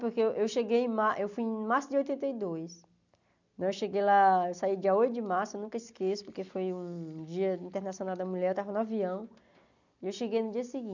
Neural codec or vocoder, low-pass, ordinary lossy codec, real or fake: none; 7.2 kHz; none; real